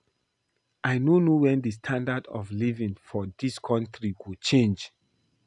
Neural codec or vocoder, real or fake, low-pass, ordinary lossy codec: none; real; 10.8 kHz; none